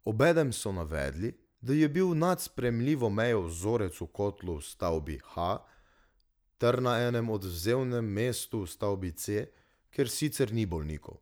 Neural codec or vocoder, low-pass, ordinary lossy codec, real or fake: none; none; none; real